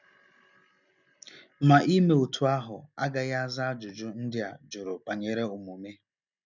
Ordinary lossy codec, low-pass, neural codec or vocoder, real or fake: none; 7.2 kHz; none; real